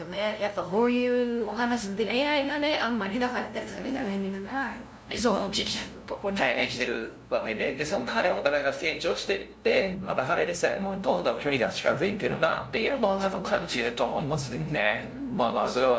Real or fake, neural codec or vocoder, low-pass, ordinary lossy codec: fake; codec, 16 kHz, 0.5 kbps, FunCodec, trained on LibriTTS, 25 frames a second; none; none